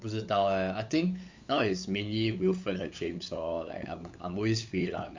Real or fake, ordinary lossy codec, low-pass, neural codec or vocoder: fake; MP3, 64 kbps; 7.2 kHz; codec, 16 kHz, 8 kbps, FunCodec, trained on LibriTTS, 25 frames a second